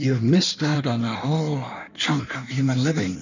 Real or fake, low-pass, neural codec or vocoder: fake; 7.2 kHz; codec, 16 kHz in and 24 kHz out, 1.1 kbps, FireRedTTS-2 codec